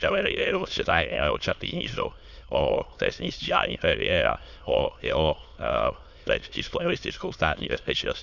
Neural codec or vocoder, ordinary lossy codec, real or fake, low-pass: autoencoder, 22.05 kHz, a latent of 192 numbers a frame, VITS, trained on many speakers; none; fake; 7.2 kHz